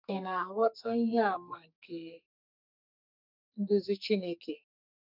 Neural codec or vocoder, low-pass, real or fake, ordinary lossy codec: codec, 44.1 kHz, 2.6 kbps, SNAC; 5.4 kHz; fake; none